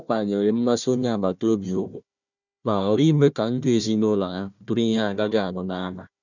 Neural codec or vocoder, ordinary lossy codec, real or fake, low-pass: codec, 16 kHz, 1 kbps, FunCodec, trained on Chinese and English, 50 frames a second; none; fake; 7.2 kHz